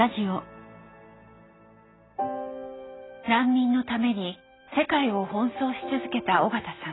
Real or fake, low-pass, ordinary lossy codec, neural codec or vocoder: real; 7.2 kHz; AAC, 16 kbps; none